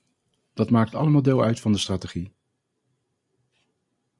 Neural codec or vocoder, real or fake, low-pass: none; real; 10.8 kHz